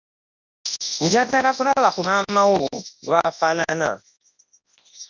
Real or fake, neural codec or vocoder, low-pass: fake; codec, 24 kHz, 0.9 kbps, WavTokenizer, large speech release; 7.2 kHz